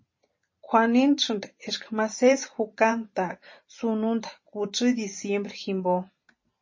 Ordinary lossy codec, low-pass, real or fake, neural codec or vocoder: MP3, 32 kbps; 7.2 kHz; fake; vocoder, 44.1 kHz, 128 mel bands every 512 samples, BigVGAN v2